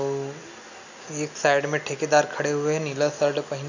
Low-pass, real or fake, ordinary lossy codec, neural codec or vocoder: 7.2 kHz; real; none; none